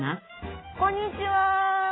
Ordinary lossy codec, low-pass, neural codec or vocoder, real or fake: AAC, 16 kbps; 7.2 kHz; none; real